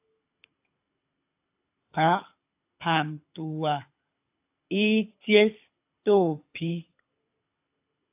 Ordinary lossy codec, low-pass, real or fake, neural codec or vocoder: AAC, 32 kbps; 3.6 kHz; fake; codec, 24 kHz, 6 kbps, HILCodec